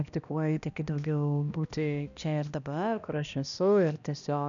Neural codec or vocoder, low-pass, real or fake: codec, 16 kHz, 1 kbps, X-Codec, HuBERT features, trained on balanced general audio; 7.2 kHz; fake